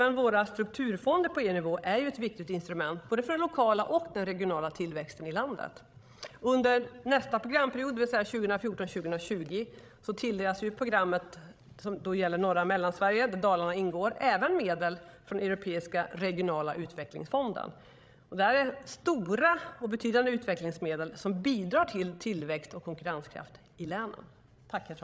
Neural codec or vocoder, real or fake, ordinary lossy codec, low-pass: codec, 16 kHz, 16 kbps, FreqCodec, larger model; fake; none; none